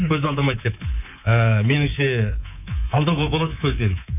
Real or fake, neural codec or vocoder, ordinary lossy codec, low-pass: fake; codec, 24 kHz, 3.1 kbps, DualCodec; none; 3.6 kHz